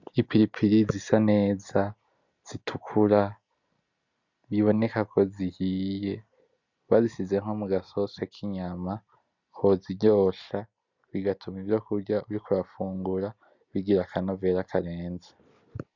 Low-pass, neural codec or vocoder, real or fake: 7.2 kHz; none; real